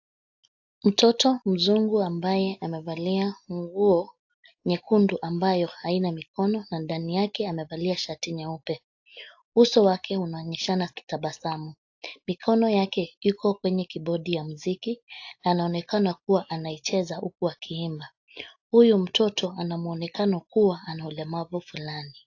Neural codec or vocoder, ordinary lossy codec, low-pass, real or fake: none; AAC, 48 kbps; 7.2 kHz; real